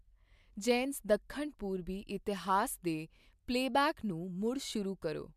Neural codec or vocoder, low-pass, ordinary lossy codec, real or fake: none; 14.4 kHz; MP3, 96 kbps; real